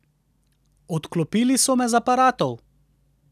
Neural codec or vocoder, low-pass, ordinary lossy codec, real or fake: none; 14.4 kHz; none; real